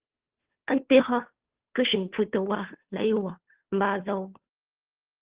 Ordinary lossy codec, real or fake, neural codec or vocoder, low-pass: Opus, 16 kbps; fake; codec, 16 kHz, 2 kbps, FunCodec, trained on Chinese and English, 25 frames a second; 3.6 kHz